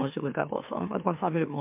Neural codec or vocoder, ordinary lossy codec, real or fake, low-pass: autoencoder, 44.1 kHz, a latent of 192 numbers a frame, MeloTTS; MP3, 32 kbps; fake; 3.6 kHz